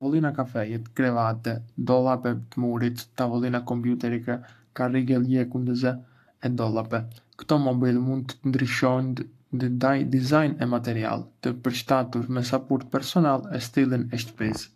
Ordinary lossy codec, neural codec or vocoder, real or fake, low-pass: AAC, 64 kbps; codec, 44.1 kHz, 7.8 kbps, Pupu-Codec; fake; 14.4 kHz